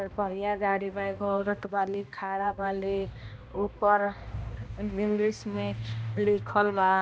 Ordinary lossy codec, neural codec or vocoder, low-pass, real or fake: none; codec, 16 kHz, 1 kbps, X-Codec, HuBERT features, trained on balanced general audio; none; fake